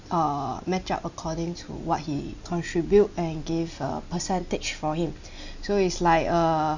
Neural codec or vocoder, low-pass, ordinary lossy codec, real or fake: none; 7.2 kHz; none; real